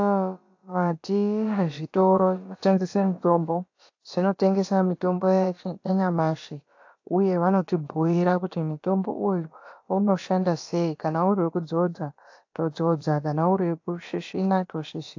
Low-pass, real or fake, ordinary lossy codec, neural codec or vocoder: 7.2 kHz; fake; AAC, 48 kbps; codec, 16 kHz, about 1 kbps, DyCAST, with the encoder's durations